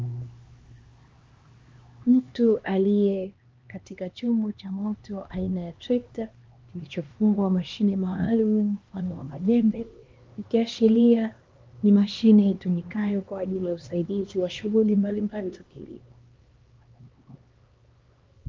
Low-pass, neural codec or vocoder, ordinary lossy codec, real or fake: 7.2 kHz; codec, 16 kHz, 2 kbps, X-Codec, HuBERT features, trained on LibriSpeech; Opus, 32 kbps; fake